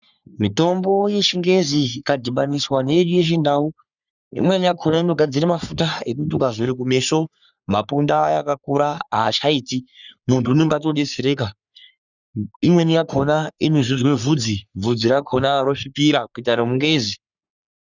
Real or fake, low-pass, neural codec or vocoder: fake; 7.2 kHz; codec, 44.1 kHz, 3.4 kbps, Pupu-Codec